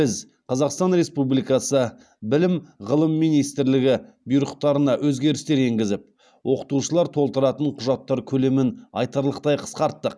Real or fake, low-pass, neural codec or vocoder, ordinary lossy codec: real; none; none; none